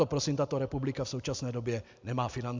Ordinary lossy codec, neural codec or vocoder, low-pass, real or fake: MP3, 64 kbps; none; 7.2 kHz; real